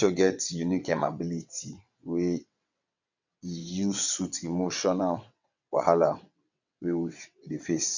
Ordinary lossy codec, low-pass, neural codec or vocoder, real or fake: none; 7.2 kHz; vocoder, 22.05 kHz, 80 mel bands, WaveNeXt; fake